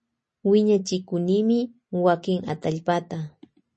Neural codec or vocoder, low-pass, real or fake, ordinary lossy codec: none; 10.8 kHz; real; MP3, 32 kbps